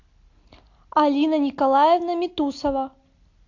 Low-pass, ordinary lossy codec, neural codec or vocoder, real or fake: 7.2 kHz; AAC, 48 kbps; none; real